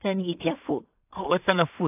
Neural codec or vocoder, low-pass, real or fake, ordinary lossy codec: codec, 16 kHz in and 24 kHz out, 0.4 kbps, LongCat-Audio-Codec, two codebook decoder; 3.6 kHz; fake; AAC, 32 kbps